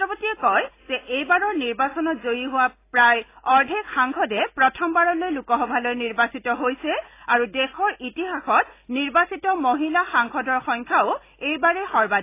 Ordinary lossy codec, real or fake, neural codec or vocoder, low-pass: AAC, 24 kbps; real; none; 3.6 kHz